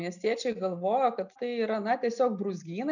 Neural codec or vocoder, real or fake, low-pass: none; real; 7.2 kHz